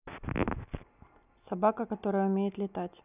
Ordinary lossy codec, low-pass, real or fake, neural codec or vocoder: none; 3.6 kHz; real; none